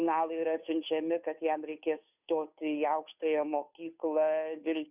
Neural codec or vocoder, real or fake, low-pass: codec, 16 kHz, 2 kbps, FunCodec, trained on Chinese and English, 25 frames a second; fake; 3.6 kHz